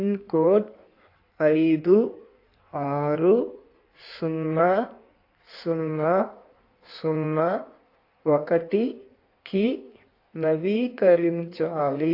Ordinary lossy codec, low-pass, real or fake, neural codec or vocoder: AAC, 32 kbps; 5.4 kHz; fake; codec, 16 kHz in and 24 kHz out, 1.1 kbps, FireRedTTS-2 codec